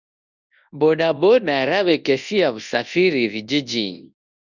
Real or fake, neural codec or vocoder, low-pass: fake; codec, 24 kHz, 0.9 kbps, WavTokenizer, large speech release; 7.2 kHz